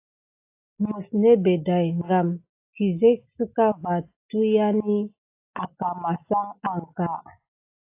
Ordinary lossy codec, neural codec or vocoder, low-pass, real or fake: AAC, 24 kbps; none; 3.6 kHz; real